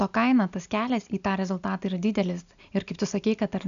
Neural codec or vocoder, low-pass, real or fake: none; 7.2 kHz; real